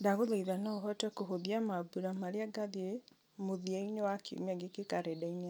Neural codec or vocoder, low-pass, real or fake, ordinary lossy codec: none; none; real; none